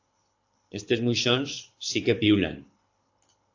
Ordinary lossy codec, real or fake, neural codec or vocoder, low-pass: AAC, 48 kbps; fake; codec, 24 kHz, 6 kbps, HILCodec; 7.2 kHz